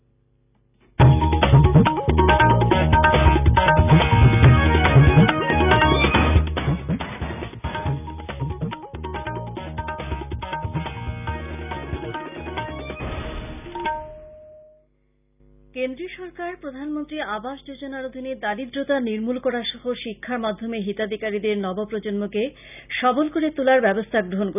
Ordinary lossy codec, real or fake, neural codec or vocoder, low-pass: none; real; none; 3.6 kHz